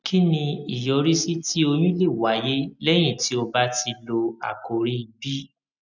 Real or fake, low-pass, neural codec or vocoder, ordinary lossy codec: real; 7.2 kHz; none; none